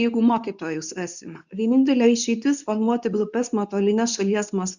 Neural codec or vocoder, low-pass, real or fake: codec, 24 kHz, 0.9 kbps, WavTokenizer, medium speech release version 2; 7.2 kHz; fake